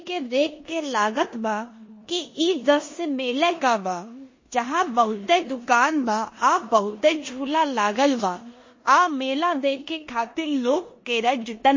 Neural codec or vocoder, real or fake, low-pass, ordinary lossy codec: codec, 16 kHz in and 24 kHz out, 0.9 kbps, LongCat-Audio-Codec, four codebook decoder; fake; 7.2 kHz; MP3, 32 kbps